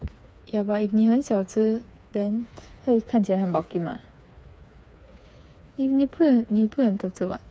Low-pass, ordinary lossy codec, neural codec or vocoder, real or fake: none; none; codec, 16 kHz, 4 kbps, FreqCodec, smaller model; fake